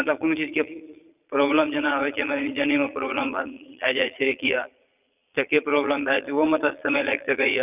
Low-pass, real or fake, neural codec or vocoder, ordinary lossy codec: 3.6 kHz; fake; vocoder, 22.05 kHz, 80 mel bands, Vocos; none